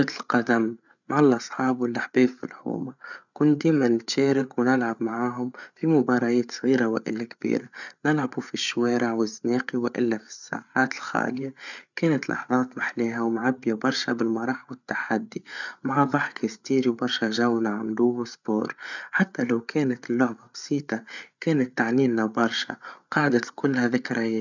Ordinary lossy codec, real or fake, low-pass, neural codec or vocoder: none; fake; 7.2 kHz; codec, 16 kHz, 8 kbps, FreqCodec, larger model